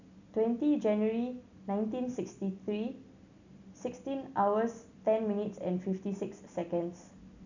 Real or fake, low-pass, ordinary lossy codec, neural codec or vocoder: real; 7.2 kHz; none; none